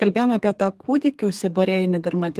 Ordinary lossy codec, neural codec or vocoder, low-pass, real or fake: Opus, 24 kbps; codec, 32 kHz, 1.9 kbps, SNAC; 14.4 kHz; fake